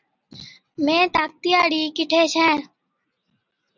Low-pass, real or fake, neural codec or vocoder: 7.2 kHz; real; none